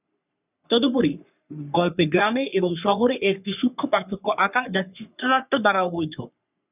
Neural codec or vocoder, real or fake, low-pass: codec, 44.1 kHz, 3.4 kbps, Pupu-Codec; fake; 3.6 kHz